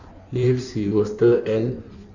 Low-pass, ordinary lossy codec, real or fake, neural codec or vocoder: 7.2 kHz; none; fake; codec, 16 kHz in and 24 kHz out, 1.1 kbps, FireRedTTS-2 codec